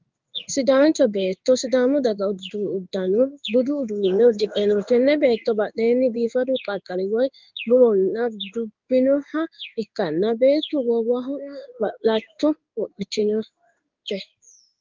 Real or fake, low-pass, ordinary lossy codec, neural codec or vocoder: fake; 7.2 kHz; Opus, 24 kbps; codec, 16 kHz in and 24 kHz out, 1 kbps, XY-Tokenizer